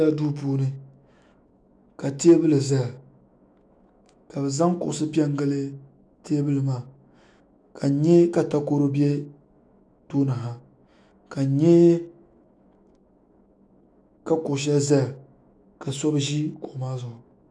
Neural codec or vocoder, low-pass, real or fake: autoencoder, 48 kHz, 128 numbers a frame, DAC-VAE, trained on Japanese speech; 9.9 kHz; fake